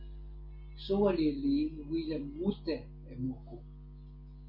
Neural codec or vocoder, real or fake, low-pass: none; real; 5.4 kHz